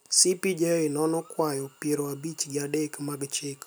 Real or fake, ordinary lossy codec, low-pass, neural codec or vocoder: real; none; none; none